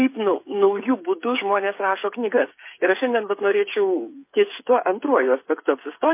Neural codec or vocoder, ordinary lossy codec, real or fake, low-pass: codec, 16 kHz, 8 kbps, FreqCodec, smaller model; MP3, 24 kbps; fake; 3.6 kHz